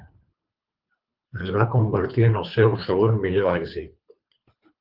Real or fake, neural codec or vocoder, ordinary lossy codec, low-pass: fake; codec, 24 kHz, 3 kbps, HILCodec; Opus, 32 kbps; 5.4 kHz